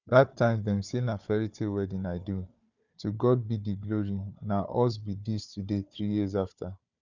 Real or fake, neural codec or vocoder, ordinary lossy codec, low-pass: fake; codec, 16 kHz, 4 kbps, FunCodec, trained on Chinese and English, 50 frames a second; none; 7.2 kHz